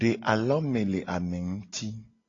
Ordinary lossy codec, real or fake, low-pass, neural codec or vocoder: AAC, 32 kbps; real; 7.2 kHz; none